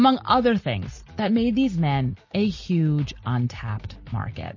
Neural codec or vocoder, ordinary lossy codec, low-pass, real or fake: none; MP3, 32 kbps; 7.2 kHz; real